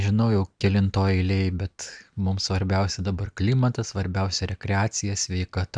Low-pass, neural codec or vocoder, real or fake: 9.9 kHz; none; real